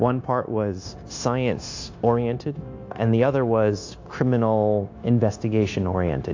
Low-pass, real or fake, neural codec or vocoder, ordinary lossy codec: 7.2 kHz; fake; codec, 16 kHz, 0.9 kbps, LongCat-Audio-Codec; AAC, 48 kbps